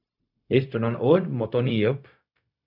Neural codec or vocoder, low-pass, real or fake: codec, 16 kHz, 0.4 kbps, LongCat-Audio-Codec; 5.4 kHz; fake